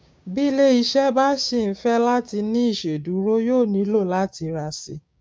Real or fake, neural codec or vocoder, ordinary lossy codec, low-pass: fake; codec, 16 kHz, 6 kbps, DAC; none; none